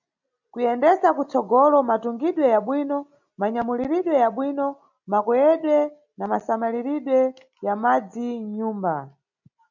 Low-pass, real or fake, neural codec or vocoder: 7.2 kHz; real; none